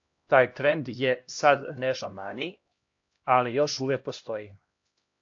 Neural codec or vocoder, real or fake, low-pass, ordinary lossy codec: codec, 16 kHz, 1 kbps, X-Codec, HuBERT features, trained on LibriSpeech; fake; 7.2 kHz; AAC, 48 kbps